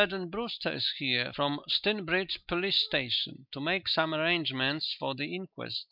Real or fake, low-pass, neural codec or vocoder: real; 5.4 kHz; none